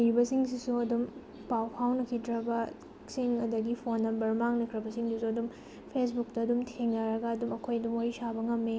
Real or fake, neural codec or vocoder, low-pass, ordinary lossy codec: real; none; none; none